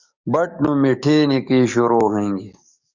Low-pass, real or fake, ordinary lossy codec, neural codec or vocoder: 7.2 kHz; fake; Opus, 64 kbps; codec, 44.1 kHz, 7.8 kbps, DAC